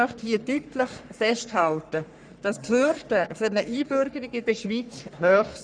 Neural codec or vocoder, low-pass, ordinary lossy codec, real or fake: codec, 44.1 kHz, 3.4 kbps, Pupu-Codec; 9.9 kHz; none; fake